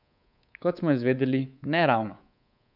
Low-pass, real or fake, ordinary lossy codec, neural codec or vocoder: 5.4 kHz; fake; none; codec, 24 kHz, 3.1 kbps, DualCodec